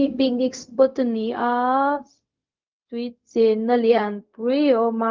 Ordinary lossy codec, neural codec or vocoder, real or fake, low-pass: Opus, 32 kbps; codec, 16 kHz, 0.4 kbps, LongCat-Audio-Codec; fake; 7.2 kHz